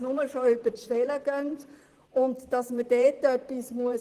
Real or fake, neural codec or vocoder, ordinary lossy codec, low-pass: fake; vocoder, 44.1 kHz, 128 mel bands, Pupu-Vocoder; Opus, 16 kbps; 14.4 kHz